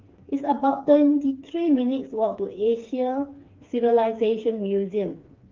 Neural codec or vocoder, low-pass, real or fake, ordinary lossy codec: codec, 16 kHz, 4 kbps, FreqCodec, smaller model; 7.2 kHz; fake; Opus, 24 kbps